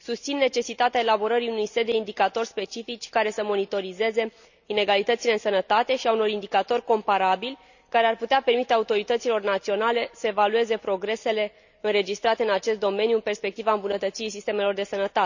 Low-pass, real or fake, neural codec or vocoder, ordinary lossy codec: 7.2 kHz; real; none; none